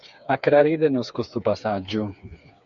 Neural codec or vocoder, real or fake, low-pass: codec, 16 kHz, 4 kbps, FreqCodec, smaller model; fake; 7.2 kHz